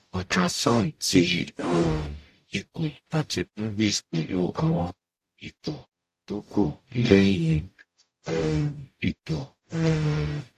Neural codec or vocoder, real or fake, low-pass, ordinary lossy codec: codec, 44.1 kHz, 0.9 kbps, DAC; fake; 14.4 kHz; AAC, 64 kbps